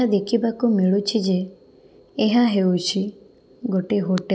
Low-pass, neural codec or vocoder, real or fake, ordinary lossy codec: none; none; real; none